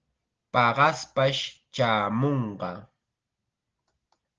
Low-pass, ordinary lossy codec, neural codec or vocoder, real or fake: 7.2 kHz; Opus, 16 kbps; none; real